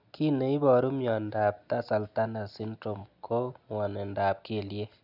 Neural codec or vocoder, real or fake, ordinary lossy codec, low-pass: none; real; none; 5.4 kHz